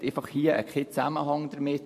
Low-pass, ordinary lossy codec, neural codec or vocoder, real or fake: 14.4 kHz; MP3, 64 kbps; vocoder, 44.1 kHz, 128 mel bands every 512 samples, BigVGAN v2; fake